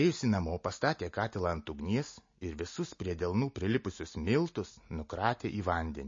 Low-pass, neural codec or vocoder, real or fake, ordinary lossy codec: 7.2 kHz; none; real; MP3, 32 kbps